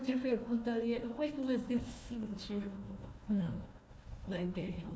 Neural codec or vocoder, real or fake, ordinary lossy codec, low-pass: codec, 16 kHz, 1 kbps, FunCodec, trained on Chinese and English, 50 frames a second; fake; none; none